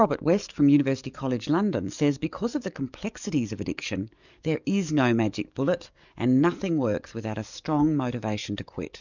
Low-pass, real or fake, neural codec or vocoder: 7.2 kHz; fake; codec, 44.1 kHz, 7.8 kbps, DAC